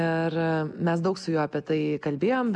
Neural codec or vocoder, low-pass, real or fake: none; 10.8 kHz; real